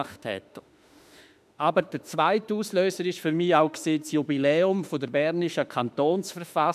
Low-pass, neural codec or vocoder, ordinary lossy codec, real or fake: 14.4 kHz; autoencoder, 48 kHz, 32 numbers a frame, DAC-VAE, trained on Japanese speech; none; fake